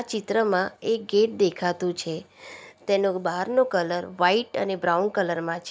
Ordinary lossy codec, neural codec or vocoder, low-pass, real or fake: none; none; none; real